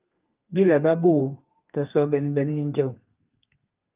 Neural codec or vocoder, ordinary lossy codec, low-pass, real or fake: codec, 32 kHz, 1.9 kbps, SNAC; Opus, 24 kbps; 3.6 kHz; fake